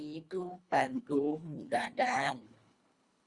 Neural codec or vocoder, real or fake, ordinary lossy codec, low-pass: codec, 24 kHz, 1.5 kbps, HILCodec; fake; Opus, 64 kbps; 10.8 kHz